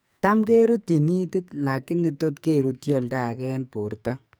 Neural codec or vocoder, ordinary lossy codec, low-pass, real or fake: codec, 44.1 kHz, 2.6 kbps, SNAC; none; none; fake